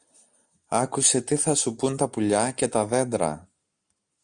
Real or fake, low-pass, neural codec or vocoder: real; 9.9 kHz; none